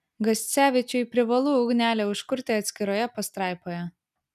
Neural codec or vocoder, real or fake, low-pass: none; real; 14.4 kHz